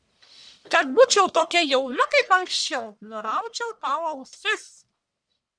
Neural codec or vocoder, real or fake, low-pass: codec, 44.1 kHz, 1.7 kbps, Pupu-Codec; fake; 9.9 kHz